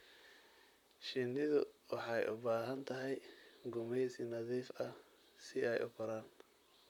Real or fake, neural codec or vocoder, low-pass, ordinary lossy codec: fake; vocoder, 44.1 kHz, 128 mel bands, Pupu-Vocoder; 19.8 kHz; none